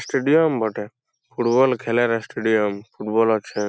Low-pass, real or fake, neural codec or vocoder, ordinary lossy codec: none; real; none; none